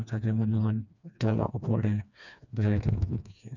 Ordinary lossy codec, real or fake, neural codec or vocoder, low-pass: none; fake; codec, 16 kHz, 2 kbps, FreqCodec, smaller model; 7.2 kHz